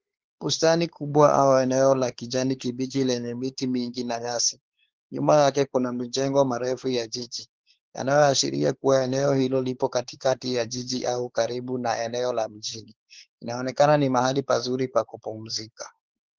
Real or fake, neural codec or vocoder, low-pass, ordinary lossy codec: fake; codec, 16 kHz, 4 kbps, X-Codec, WavLM features, trained on Multilingual LibriSpeech; 7.2 kHz; Opus, 16 kbps